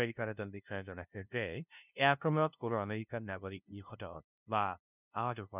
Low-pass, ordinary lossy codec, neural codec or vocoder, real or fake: 3.6 kHz; none; codec, 16 kHz, 0.5 kbps, FunCodec, trained on LibriTTS, 25 frames a second; fake